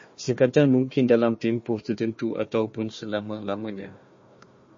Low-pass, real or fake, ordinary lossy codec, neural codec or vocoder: 7.2 kHz; fake; MP3, 32 kbps; codec, 16 kHz, 1 kbps, FunCodec, trained on Chinese and English, 50 frames a second